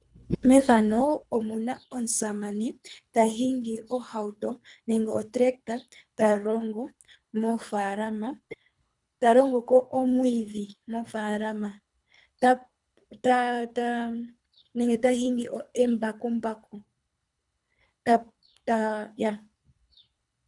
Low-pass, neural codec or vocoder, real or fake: 10.8 kHz; codec, 24 kHz, 3 kbps, HILCodec; fake